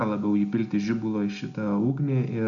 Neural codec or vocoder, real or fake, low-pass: none; real; 7.2 kHz